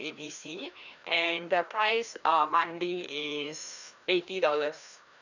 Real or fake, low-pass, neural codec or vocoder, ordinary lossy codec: fake; 7.2 kHz; codec, 16 kHz, 1 kbps, FreqCodec, larger model; none